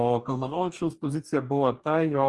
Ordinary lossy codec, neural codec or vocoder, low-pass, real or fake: Opus, 24 kbps; codec, 44.1 kHz, 2.6 kbps, DAC; 10.8 kHz; fake